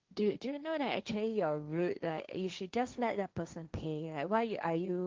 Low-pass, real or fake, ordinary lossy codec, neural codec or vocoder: 7.2 kHz; fake; Opus, 32 kbps; codec, 16 kHz, 1.1 kbps, Voila-Tokenizer